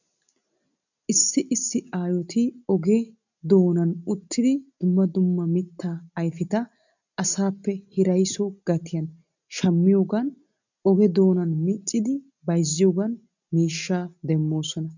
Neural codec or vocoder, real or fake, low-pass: none; real; 7.2 kHz